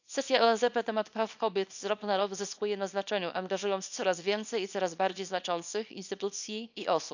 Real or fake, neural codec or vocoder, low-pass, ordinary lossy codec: fake; codec, 24 kHz, 0.9 kbps, WavTokenizer, small release; 7.2 kHz; none